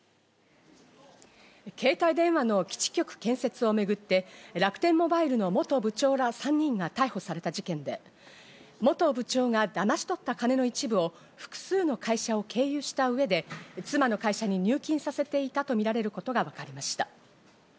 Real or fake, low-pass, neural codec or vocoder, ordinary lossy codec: real; none; none; none